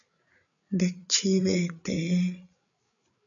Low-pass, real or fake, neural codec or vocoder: 7.2 kHz; fake; codec, 16 kHz, 8 kbps, FreqCodec, larger model